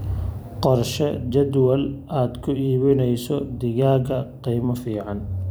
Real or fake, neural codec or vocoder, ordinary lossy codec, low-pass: fake; vocoder, 44.1 kHz, 128 mel bands every 256 samples, BigVGAN v2; none; none